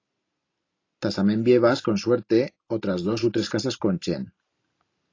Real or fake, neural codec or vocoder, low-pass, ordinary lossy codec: real; none; 7.2 kHz; AAC, 32 kbps